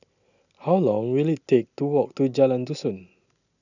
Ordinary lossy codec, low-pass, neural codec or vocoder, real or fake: none; 7.2 kHz; none; real